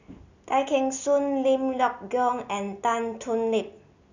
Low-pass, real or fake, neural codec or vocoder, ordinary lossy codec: 7.2 kHz; real; none; none